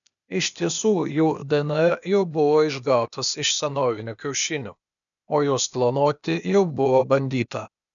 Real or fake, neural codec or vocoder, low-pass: fake; codec, 16 kHz, 0.8 kbps, ZipCodec; 7.2 kHz